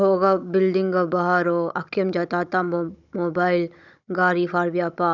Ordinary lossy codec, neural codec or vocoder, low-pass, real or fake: none; codec, 16 kHz, 16 kbps, FunCodec, trained on Chinese and English, 50 frames a second; 7.2 kHz; fake